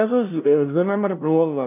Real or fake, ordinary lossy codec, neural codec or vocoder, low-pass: fake; none; codec, 16 kHz, 0.5 kbps, FunCodec, trained on LibriTTS, 25 frames a second; 3.6 kHz